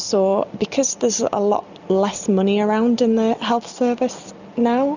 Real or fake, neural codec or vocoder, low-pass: real; none; 7.2 kHz